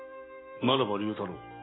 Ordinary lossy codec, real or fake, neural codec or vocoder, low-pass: AAC, 16 kbps; real; none; 7.2 kHz